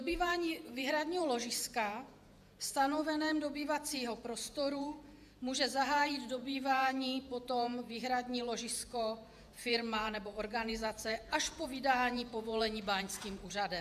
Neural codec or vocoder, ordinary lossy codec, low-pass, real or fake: vocoder, 48 kHz, 128 mel bands, Vocos; AAC, 96 kbps; 14.4 kHz; fake